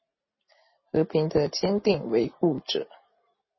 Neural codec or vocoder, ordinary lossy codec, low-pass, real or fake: none; MP3, 24 kbps; 7.2 kHz; real